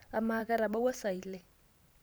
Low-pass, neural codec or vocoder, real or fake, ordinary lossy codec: none; vocoder, 44.1 kHz, 128 mel bands every 512 samples, BigVGAN v2; fake; none